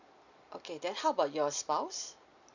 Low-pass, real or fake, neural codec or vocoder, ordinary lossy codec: 7.2 kHz; real; none; none